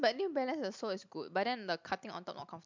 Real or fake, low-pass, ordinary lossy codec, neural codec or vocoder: real; 7.2 kHz; MP3, 64 kbps; none